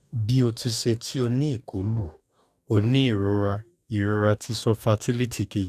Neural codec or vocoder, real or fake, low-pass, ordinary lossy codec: codec, 44.1 kHz, 2.6 kbps, DAC; fake; 14.4 kHz; none